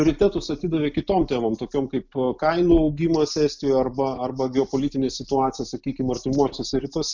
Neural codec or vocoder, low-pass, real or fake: none; 7.2 kHz; real